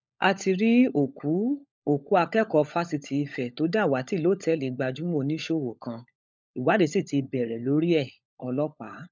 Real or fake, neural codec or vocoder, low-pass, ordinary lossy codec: fake; codec, 16 kHz, 16 kbps, FunCodec, trained on LibriTTS, 50 frames a second; none; none